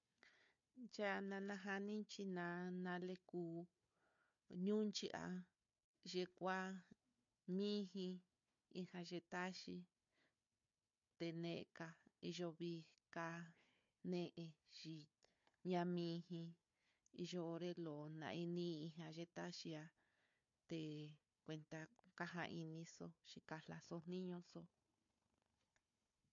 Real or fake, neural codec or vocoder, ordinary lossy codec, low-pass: fake; codec, 16 kHz, 4 kbps, FunCodec, trained on Chinese and English, 50 frames a second; MP3, 48 kbps; 7.2 kHz